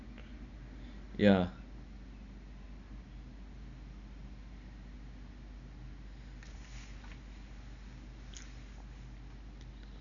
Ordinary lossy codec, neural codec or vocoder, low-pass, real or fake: none; none; 7.2 kHz; real